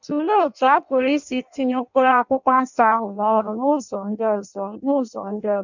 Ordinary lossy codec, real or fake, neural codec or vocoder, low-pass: none; fake; codec, 16 kHz in and 24 kHz out, 0.6 kbps, FireRedTTS-2 codec; 7.2 kHz